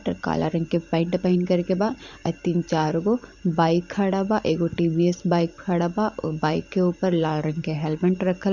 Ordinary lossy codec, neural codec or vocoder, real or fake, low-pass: none; vocoder, 44.1 kHz, 128 mel bands every 512 samples, BigVGAN v2; fake; 7.2 kHz